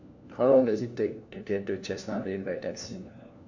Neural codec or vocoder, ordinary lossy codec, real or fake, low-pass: codec, 16 kHz, 1 kbps, FunCodec, trained on LibriTTS, 50 frames a second; none; fake; 7.2 kHz